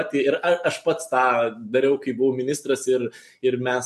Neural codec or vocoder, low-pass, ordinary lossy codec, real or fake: none; 14.4 kHz; MP3, 64 kbps; real